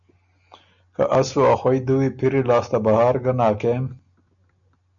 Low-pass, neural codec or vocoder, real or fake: 7.2 kHz; none; real